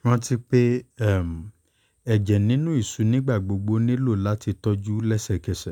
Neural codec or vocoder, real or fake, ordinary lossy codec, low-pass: none; real; none; 19.8 kHz